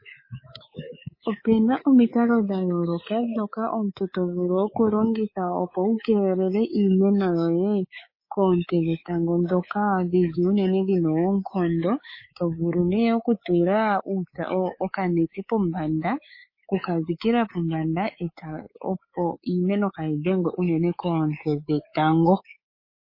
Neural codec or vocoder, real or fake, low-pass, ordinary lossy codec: codec, 24 kHz, 3.1 kbps, DualCodec; fake; 5.4 kHz; MP3, 24 kbps